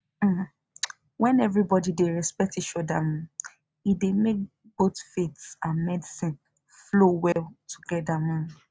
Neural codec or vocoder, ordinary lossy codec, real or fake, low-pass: none; none; real; none